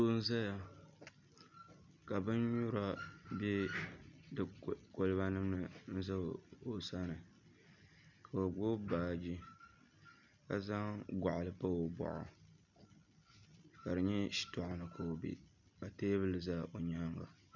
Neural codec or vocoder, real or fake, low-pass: none; real; 7.2 kHz